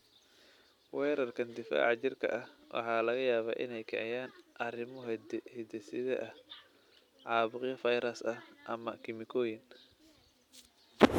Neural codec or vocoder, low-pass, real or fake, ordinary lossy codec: none; 19.8 kHz; real; none